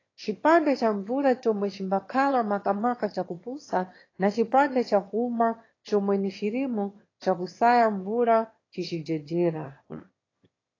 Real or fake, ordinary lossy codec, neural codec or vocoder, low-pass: fake; AAC, 32 kbps; autoencoder, 22.05 kHz, a latent of 192 numbers a frame, VITS, trained on one speaker; 7.2 kHz